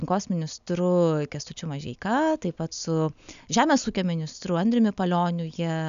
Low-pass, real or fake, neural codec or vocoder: 7.2 kHz; real; none